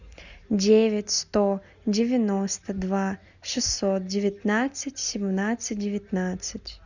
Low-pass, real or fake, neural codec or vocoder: 7.2 kHz; real; none